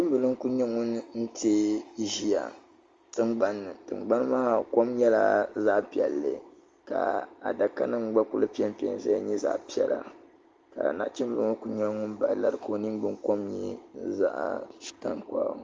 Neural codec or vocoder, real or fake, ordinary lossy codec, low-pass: none; real; Opus, 32 kbps; 7.2 kHz